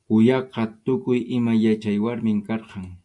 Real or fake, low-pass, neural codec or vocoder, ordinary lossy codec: real; 10.8 kHz; none; AAC, 64 kbps